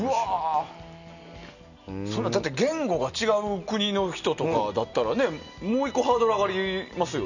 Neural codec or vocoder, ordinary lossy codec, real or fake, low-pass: none; none; real; 7.2 kHz